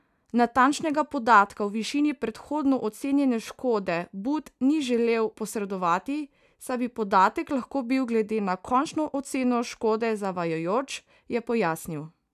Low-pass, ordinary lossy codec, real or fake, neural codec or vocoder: 14.4 kHz; none; real; none